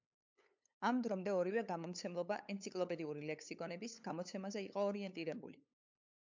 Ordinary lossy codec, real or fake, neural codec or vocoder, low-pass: MP3, 64 kbps; fake; codec, 16 kHz, 8 kbps, FunCodec, trained on LibriTTS, 25 frames a second; 7.2 kHz